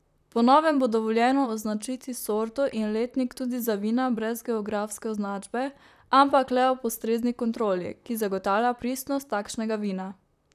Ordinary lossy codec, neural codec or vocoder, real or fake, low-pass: none; vocoder, 44.1 kHz, 128 mel bands, Pupu-Vocoder; fake; 14.4 kHz